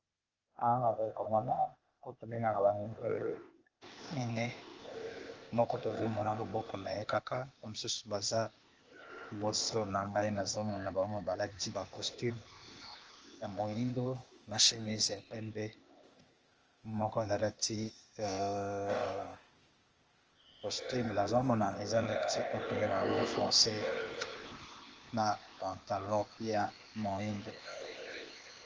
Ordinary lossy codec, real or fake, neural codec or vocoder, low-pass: Opus, 24 kbps; fake; codec, 16 kHz, 0.8 kbps, ZipCodec; 7.2 kHz